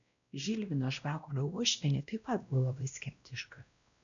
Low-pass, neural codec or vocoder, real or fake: 7.2 kHz; codec, 16 kHz, 1 kbps, X-Codec, WavLM features, trained on Multilingual LibriSpeech; fake